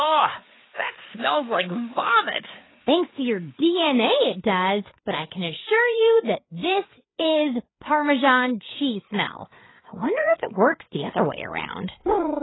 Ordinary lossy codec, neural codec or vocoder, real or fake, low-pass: AAC, 16 kbps; codec, 16 kHz, 4 kbps, FreqCodec, larger model; fake; 7.2 kHz